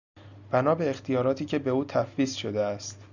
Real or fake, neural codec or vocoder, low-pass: real; none; 7.2 kHz